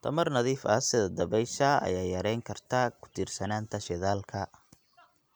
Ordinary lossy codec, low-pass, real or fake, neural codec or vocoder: none; none; real; none